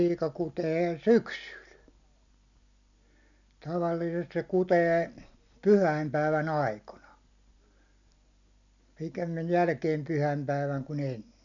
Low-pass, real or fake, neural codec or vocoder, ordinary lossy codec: 7.2 kHz; real; none; none